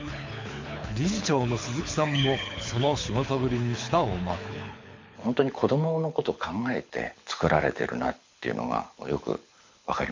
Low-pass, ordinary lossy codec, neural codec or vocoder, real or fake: 7.2 kHz; MP3, 48 kbps; codec, 24 kHz, 6 kbps, HILCodec; fake